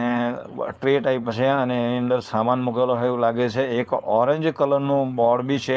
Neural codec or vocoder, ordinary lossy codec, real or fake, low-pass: codec, 16 kHz, 4.8 kbps, FACodec; none; fake; none